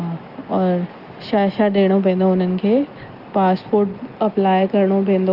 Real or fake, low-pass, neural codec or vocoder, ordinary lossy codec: real; 5.4 kHz; none; Opus, 24 kbps